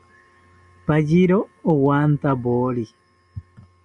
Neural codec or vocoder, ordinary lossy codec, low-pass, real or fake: none; MP3, 96 kbps; 10.8 kHz; real